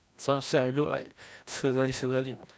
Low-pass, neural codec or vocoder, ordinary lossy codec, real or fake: none; codec, 16 kHz, 1 kbps, FreqCodec, larger model; none; fake